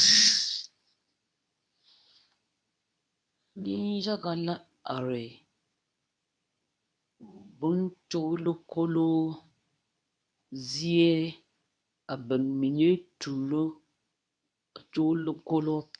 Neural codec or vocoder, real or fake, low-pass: codec, 24 kHz, 0.9 kbps, WavTokenizer, medium speech release version 2; fake; 9.9 kHz